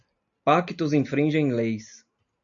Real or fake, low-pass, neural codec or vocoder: real; 7.2 kHz; none